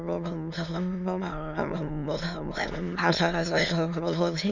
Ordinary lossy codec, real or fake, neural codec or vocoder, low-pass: none; fake; autoencoder, 22.05 kHz, a latent of 192 numbers a frame, VITS, trained on many speakers; 7.2 kHz